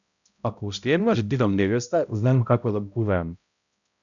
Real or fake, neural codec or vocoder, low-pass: fake; codec, 16 kHz, 0.5 kbps, X-Codec, HuBERT features, trained on balanced general audio; 7.2 kHz